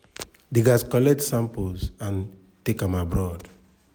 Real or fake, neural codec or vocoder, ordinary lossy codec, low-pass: real; none; none; none